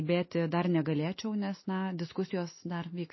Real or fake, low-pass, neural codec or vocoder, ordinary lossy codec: real; 7.2 kHz; none; MP3, 24 kbps